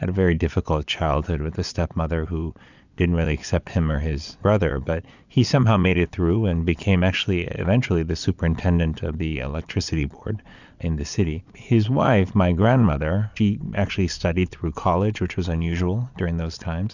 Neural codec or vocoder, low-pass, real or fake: vocoder, 22.05 kHz, 80 mel bands, Vocos; 7.2 kHz; fake